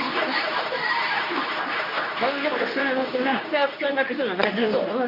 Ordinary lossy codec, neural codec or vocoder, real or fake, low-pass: none; codec, 24 kHz, 0.9 kbps, WavTokenizer, medium speech release version 2; fake; 5.4 kHz